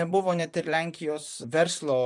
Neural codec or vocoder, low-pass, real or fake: vocoder, 44.1 kHz, 128 mel bands, Pupu-Vocoder; 10.8 kHz; fake